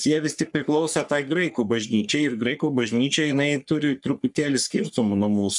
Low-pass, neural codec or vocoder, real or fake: 10.8 kHz; codec, 44.1 kHz, 3.4 kbps, Pupu-Codec; fake